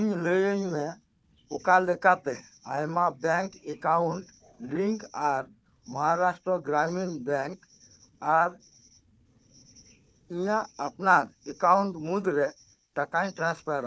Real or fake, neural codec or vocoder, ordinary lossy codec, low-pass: fake; codec, 16 kHz, 4 kbps, FunCodec, trained on Chinese and English, 50 frames a second; none; none